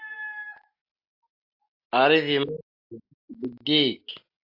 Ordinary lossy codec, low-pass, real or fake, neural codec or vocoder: AAC, 32 kbps; 5.4 kHz; real; none